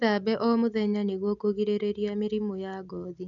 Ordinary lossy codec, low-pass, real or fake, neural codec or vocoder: none; 7.2 kHz; real; none